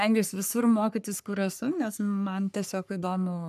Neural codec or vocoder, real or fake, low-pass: codec, 44.1 kHz, 3.4 kbps, Pupu-Codec; fake; 14.4 kHz